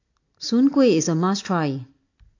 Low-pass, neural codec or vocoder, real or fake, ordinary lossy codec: 7.2 kHz; none; real; AAC, 48 kbps